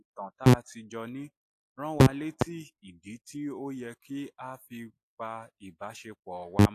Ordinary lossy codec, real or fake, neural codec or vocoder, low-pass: AAC, 64 kbps; real; none; 14.4 kHz